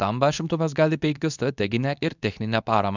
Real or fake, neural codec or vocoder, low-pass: fake; codec, 24 kHz, 0.9 kbps, WavTokenizer, medium speech release version 2; 7.2 kHz